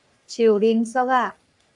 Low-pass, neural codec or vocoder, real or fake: 10.8 kHz; codec, 44.1 kHz, 3.4 kbps, Pupu-Codec; fake